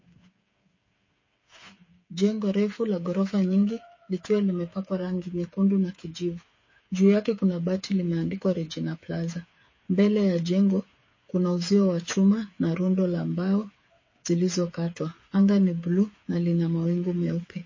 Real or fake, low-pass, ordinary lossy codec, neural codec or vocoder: fake; 7.2 kHz; MP3, 32 kbps; codec, 16 kHz, 8 kbps, FreqCodec, smaller model